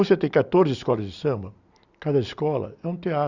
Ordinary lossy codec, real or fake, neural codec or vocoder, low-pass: Opus, 64 kbps; real; none; 7.2 kHz